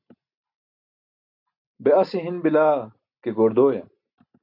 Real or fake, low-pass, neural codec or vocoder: real; 5.4 kHz; none